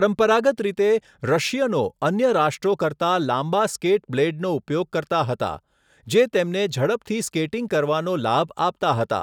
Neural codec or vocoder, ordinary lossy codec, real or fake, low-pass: none; none; real; 19.8 kHz